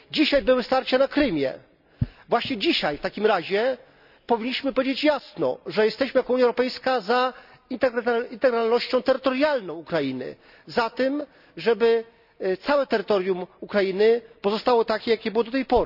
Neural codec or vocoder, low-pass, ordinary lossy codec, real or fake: none; 5.4 kHz; none; real